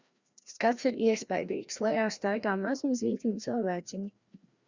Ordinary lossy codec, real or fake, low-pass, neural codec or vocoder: Opus, 64 kbps; fake; 7.2 kHz; codec, 16 kHz, 1 kbps, FreqCodec, larger model